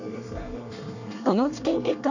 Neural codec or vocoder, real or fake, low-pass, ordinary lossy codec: codec, 24 kHz, 1 kbps, SNAC; fake; 7.2 kHz; none